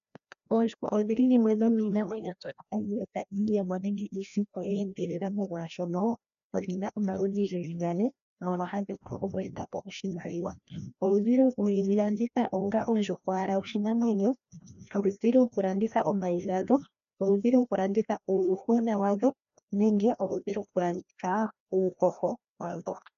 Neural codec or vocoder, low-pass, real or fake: codec, 16 kHz, 1 kbps, FreqCodec, larger model; 7.2 kHz; fake